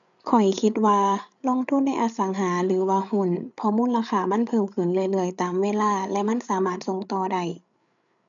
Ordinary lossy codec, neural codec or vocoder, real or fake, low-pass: none; codec, 16 kHz, 8 kbps, FreqCodec, larger model; fake; 7.2 kHz